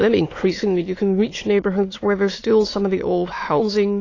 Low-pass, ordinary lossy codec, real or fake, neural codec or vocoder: 7.2 kHz; AAC, 32 kbps; fake; autoencoder, 22.05 kHz, a latent of 192 numbers a frame, VITS, trained on many speakers